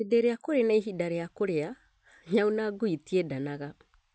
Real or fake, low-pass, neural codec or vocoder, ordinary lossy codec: real; none; none; none